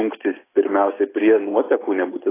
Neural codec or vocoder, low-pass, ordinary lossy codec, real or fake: none; 3.6 kHz; AAC, 16 kbps; real